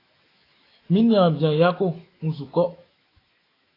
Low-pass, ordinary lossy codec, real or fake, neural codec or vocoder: 5.4 kHz; AAC, 24 kbps; fake; autoencoder, 48 kHz, 128 numbers a frame, DAC-VAE, trained on Japanese speech